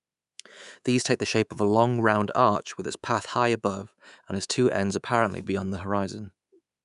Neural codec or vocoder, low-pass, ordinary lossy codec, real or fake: codec, 24 kHz, 3.1 kbps, DualCodec; 10.8 kHz; none; fake